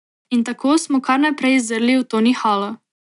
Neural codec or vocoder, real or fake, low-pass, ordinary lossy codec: none; real; 10.8 kHz; none